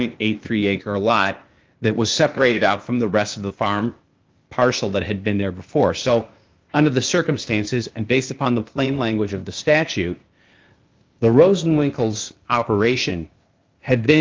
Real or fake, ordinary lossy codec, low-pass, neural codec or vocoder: fake; Opus, 32 kbps; 7.2 kHz; codec, 16 kHz, 0.8 kbps, ZipCodec